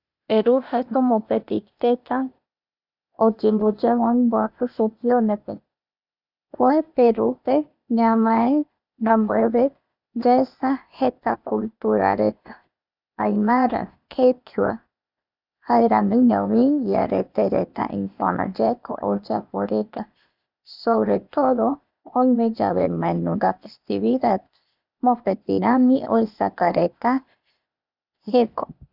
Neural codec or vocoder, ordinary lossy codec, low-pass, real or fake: codec, 16 kHz, 0.8 kbps, ZipCodec; none; 5.4 kHz; fake